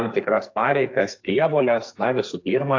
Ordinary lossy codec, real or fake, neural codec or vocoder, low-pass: AAC, 48 kbps; fake; codec, 44.1 kHz, 2.6 kbps, SNAC; 7.2 kHz